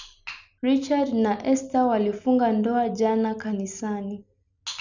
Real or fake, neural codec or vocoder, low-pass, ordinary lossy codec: real; none; 7.2 kHz; none